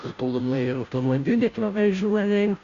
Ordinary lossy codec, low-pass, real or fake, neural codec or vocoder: Opus, 64 kbps; 7.2 kHz; fake; codec, 16 kHz, 0.5 kbps, FunCodec, trained on Chinese and English, 25 frames a second